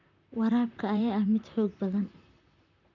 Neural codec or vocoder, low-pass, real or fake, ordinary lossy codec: vocoder, 44.1 kHz, 128 mel bands, Pupu-Vocoder; 7.2 kHz; fake; none